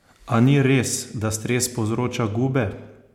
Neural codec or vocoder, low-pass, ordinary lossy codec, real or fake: none; 19.8 kHz; MP3, 96 kbps; real